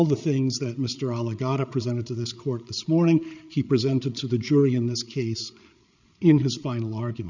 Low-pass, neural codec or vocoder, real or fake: 7.2 kHz; codec, 16 kHz, 8 kbps, FreqCodec, larger model; fake